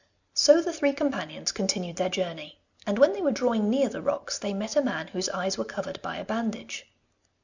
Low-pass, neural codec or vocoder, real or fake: 7.2 kHz; none; real